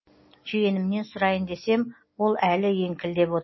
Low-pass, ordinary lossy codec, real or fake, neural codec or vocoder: 7.2 kHz; MP3, 24 kbps; real; none